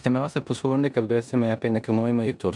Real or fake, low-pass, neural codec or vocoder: fake; 10.8 kHz; codec, 16 kHz in and 24 kHz out, 0.9 kbps, LongCat-Audio-Codec, four codebook decoder